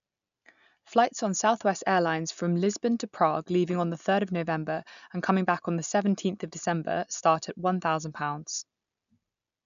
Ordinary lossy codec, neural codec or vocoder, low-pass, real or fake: none; none; 7.2 kHz; real